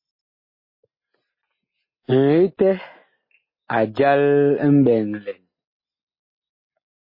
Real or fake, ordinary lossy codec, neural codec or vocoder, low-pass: real; MP3, 24 kbps; none; 5.4 kHz